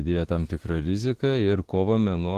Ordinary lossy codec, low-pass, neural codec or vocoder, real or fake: Opus, 16 kbps; 14.4 kHz; autoencoder, 48 kHz, 32 numbers a frame, DAC-VAE, trained on Japanese speech; fake